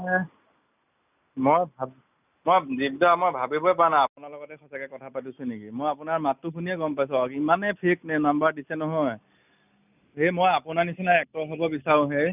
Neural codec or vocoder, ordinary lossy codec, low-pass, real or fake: none; none; 3.6 kHz; real